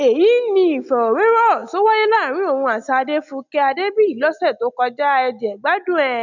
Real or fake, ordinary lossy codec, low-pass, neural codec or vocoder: real; none; 7.2 kHz; none